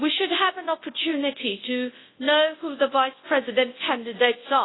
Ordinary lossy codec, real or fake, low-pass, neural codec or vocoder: AAC, 16 kbps; fake; 7.2 kHz; codec, 24 kHz, 0.9 kbps, WavTokenizer, large speech release